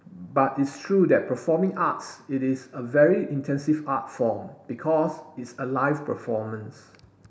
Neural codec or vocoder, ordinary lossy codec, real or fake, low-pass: none; none; real; none